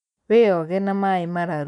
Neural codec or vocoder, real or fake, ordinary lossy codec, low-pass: none; real; none; 10.8 kHz